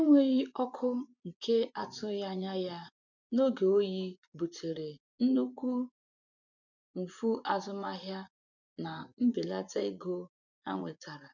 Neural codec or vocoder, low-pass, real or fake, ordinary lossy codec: vocoder, 24 kHz, 100 mel bands, Vocos; 7.2 kHz; fake; none